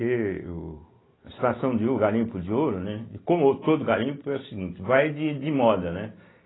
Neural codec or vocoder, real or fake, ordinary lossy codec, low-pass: none; real; AAC, 16 kbps; 7.2 kHz